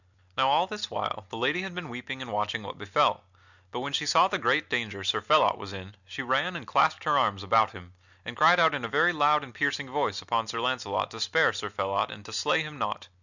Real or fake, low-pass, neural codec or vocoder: real; 7.2 kHz; none